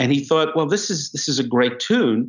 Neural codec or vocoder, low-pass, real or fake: none; 7.2 kHz; real